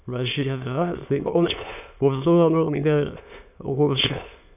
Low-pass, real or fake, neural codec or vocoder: 3.6 kHz; fake; autoencoder, 22.05 kHz, a latent of 192 numbers a frame, VITS, trained on many speakers